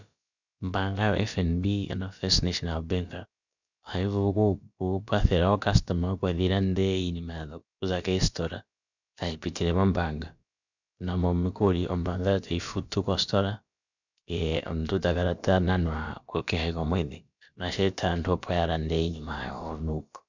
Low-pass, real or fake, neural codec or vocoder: 7.2 kHz; fake; codec, 16 kHz, about 1 kbps, DyCAST, with the encoder's durations